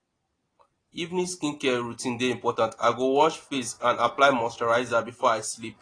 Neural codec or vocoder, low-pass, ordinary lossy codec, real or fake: none; 9.9 kHz; AAC, 32 kbps; real